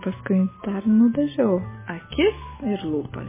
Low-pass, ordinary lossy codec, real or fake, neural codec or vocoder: 3.6 kHz; MP3, 16 kbps; real; none